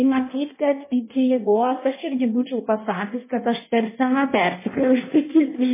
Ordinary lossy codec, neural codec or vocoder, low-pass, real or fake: MP3, 16 kbps; codec, 16 kHz in and 24 kHz out, 0.6 kbps, FireRedTTS-2 codec; 3.6 kHz; fake